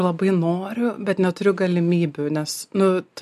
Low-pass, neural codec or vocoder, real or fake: 14.4 kHz; none; real